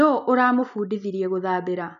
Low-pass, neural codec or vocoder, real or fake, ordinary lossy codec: 7.2 kHz; none; real; none